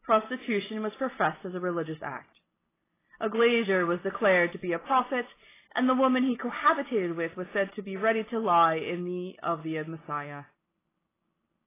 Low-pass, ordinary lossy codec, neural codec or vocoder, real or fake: 3.6 kHz; AAC, 16 kbps; none; real